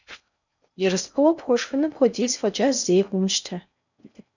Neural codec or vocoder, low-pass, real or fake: codec, 16 kHz in and 24 kHz out, 0.6 kbps, FocalCodec, streaming, 4096 codes; 7.2 kHz; fake